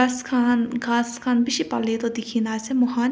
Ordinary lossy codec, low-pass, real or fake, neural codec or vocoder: none; none; real; none